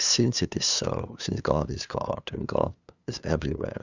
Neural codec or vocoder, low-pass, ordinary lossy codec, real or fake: codec, 16 kHz, 2 kbps, FunCodec, trained on LibriTTS, 25 frames a second; 7.2 kHz; Opus, 64 kbps; fake